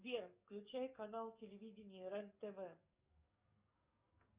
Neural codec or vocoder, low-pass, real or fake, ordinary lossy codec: codec, 44.1 kHz, 7.8 kbps, DAC; 3.6 kHz; fake; Opus, 64 kbps